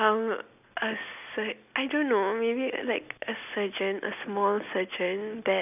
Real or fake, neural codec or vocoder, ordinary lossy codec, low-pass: real; none; none; 3.6 kHz